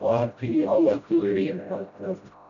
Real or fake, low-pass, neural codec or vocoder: fake; 7.2 kHz; codec, 16 kHz, 0.5 kbps, FreqCodec, smaller model